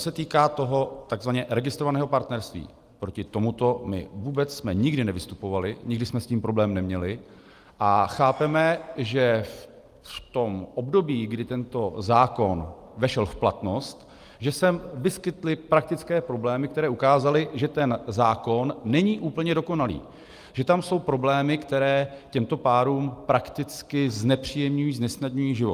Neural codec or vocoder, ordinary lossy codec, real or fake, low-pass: none; Opus, 32 kbps; real; 14.4 kHz